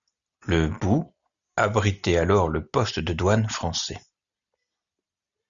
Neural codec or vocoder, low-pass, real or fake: none; 7.2 kHz; real